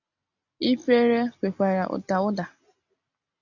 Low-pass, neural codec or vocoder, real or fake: 7.2 kHz; none; real